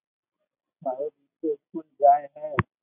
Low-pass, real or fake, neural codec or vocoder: 3.6 kHz; real; none